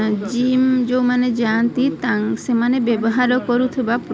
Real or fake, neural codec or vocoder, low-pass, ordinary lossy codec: real; none; none; none